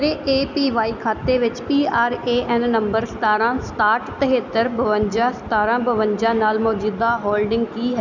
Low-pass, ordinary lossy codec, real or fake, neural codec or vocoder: 7.2 kHz; none; real; none